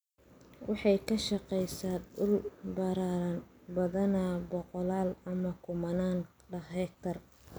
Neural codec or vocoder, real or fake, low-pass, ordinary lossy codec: none; real; none; none